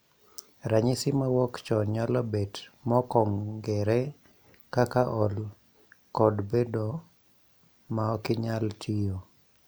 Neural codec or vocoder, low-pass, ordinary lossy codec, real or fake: none; none; none; real